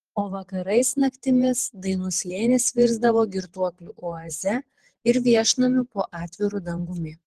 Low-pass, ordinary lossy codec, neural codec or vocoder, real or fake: 14.4 kHz; Opus, 16 kbps; vocoder, 48 kHz, 128 mel bands, Vocos; fake